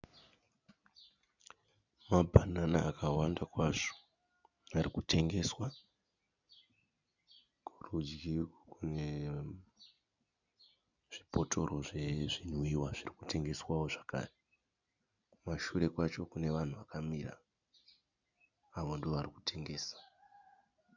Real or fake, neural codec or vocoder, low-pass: real; none; 7.2 kHz